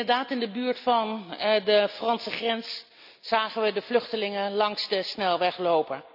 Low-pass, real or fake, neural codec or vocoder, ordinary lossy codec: 5.4 kHz; real; none; none